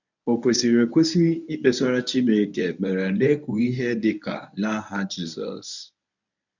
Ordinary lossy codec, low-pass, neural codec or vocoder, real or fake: none; 7.2 kHz; codec, 24 kHz, 0.9 kbps, WavTokenizer, medium speech release version 1; fake